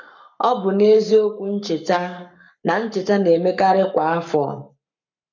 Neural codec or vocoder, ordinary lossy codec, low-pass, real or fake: codec, 44.1 kHz, 7.8 kbps, Pupu-Codec; none; 7.2 kHz; fake